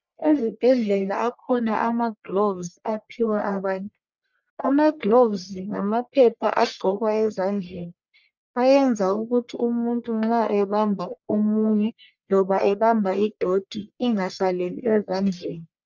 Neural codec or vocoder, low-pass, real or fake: codec, 44.1 kHz, 1.7 kbps, Pupu-Codec; 7.2 kHz; fake